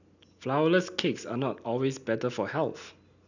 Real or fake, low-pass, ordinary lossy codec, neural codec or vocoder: real; 7.2 kHz; none; none